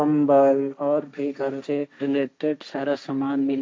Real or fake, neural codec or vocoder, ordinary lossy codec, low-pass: fake; codec, 16 kHz, 1.1 kbps, Voila-Tokenizer; none; none